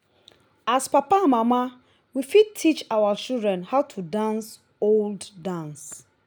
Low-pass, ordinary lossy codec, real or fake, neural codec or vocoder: none; none; real; none